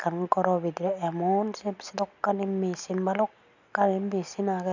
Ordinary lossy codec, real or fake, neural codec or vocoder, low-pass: none; real; none; 7.2 kHz